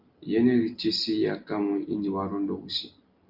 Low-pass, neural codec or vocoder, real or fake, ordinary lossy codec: 5.4 kHz; none; real; Opus, 24 kbps